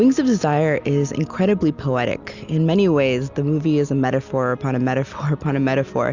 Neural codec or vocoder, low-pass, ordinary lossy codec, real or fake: none; 7.2 kHz; Opus, 64 kbps; real